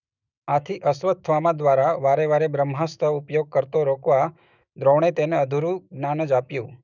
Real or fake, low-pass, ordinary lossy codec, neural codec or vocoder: fake; 7.2 kHz; none; autoencoder, 48 kHz, 128 numbers a frame, DAC-VAE, trained on Japanese speech